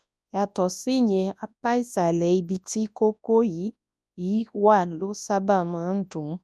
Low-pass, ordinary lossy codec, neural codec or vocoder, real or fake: none; none; codec, 24 kHz, 0.9 kbps, WavTokenizer, large speech release; fake